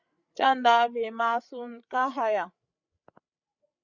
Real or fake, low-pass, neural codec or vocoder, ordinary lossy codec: fake; 7.2 kHz; codec, 16 kHz, 8 kbps, FreqCodec, larger model; Opus, 64 kbps